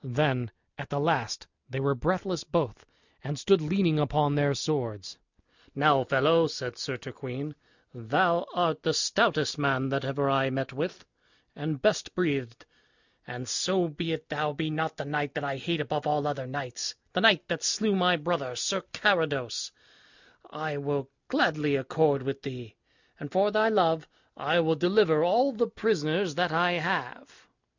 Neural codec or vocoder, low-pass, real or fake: none; 7.2 kHz; real